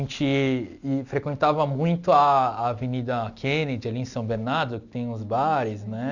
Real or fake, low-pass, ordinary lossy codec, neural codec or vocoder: real; 7.2 kHz; none; none